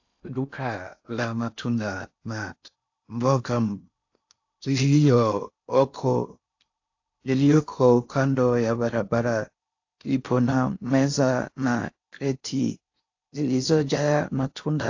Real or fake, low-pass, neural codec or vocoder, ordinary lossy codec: fake; 7.2 kHz; codec, 16 kHz in and 24 kHz out, 0.6 kbps, FocalCodec, streaming, 4096 codes; AAC, 48 kbps